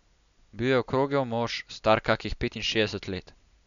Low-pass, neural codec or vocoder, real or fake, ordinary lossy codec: 7.2 kHz; none; real; MP3, 96 kbps